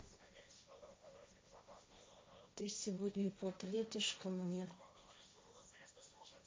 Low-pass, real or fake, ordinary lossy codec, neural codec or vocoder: none; fake; none; codec, 16 kHz, 1.1 kbps, Voila-Tokenizer